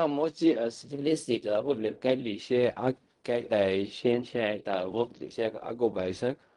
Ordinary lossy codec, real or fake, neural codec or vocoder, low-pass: Opus, 32 kbps; fake; codec, 16 kHz in and 24 kHz out, 0.4 kbps, LongCat-Audio-Codec, fine tuned four codebook decoder; 10.8 kHz